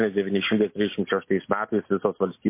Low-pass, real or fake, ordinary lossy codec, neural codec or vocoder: 3.6 kHz; real; MP3, 24 kbps; none